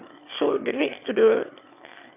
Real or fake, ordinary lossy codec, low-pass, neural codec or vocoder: fake; none; 3.6 kHz; autoencoder, 22.05 kHz, a latent of 192 numbers a frame, VITS, trained on one speaker